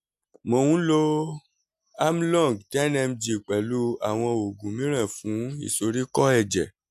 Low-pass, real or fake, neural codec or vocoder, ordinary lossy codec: 14.4 kHz; real; none; AAC, 96 kbps